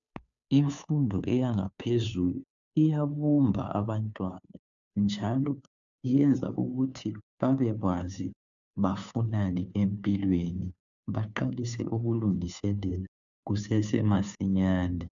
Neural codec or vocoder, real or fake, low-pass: codec, 16 kHz, 2 kbps, FunCodec, trained on Chinese and English, 25 frames a second; fake; 7.2 kHz